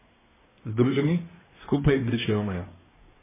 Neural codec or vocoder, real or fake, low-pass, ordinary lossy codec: codec, 24 kHz, 1 kbps, SNAC; fake; 3.6 kHz; MP3, 16 kbps